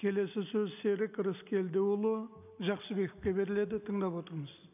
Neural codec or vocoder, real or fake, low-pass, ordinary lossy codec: none; real; 3.6 kHz; none